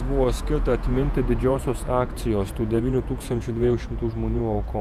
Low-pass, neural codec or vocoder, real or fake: 14.4 kHz; vocoder, 48 kHz, 128 mel bands, Vocos; fake